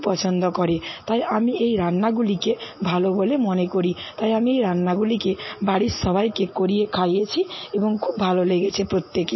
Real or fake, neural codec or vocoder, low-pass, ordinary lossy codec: real; none; 7.2 kHz; MP3, 24 kbps